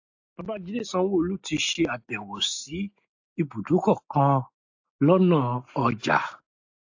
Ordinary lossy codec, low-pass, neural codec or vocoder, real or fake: AAC, 48 kbps; 7.2 kHz; none; real